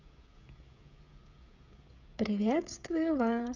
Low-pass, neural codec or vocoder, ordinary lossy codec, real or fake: 7.2 kHz; codec, 16 kHz, 16 kbps, FreqCodec, smaller model; none; fake